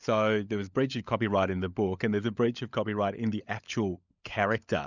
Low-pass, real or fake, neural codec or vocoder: 7.2 kHz; fake; codec, 16 kHz, 16 kbps, FunCodec, trained on LibriTTS, 50 frames a second